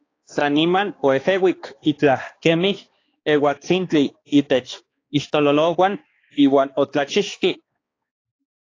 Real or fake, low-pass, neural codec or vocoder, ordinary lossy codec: fake; 7.2 kHz; codec, 16 kHz, 2 kbps, X-Codec, HuBERT features, trained on balanced general audio; AAC, 32 kbps